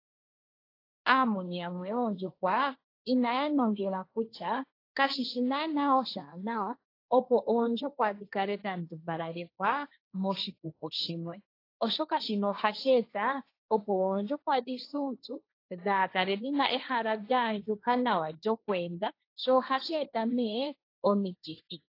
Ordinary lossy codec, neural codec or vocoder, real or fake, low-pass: AAC, 32 kbps; codec, 16 kHz, 1.1 kbps, Voila-Tokenizer; fake; 5.4 kHz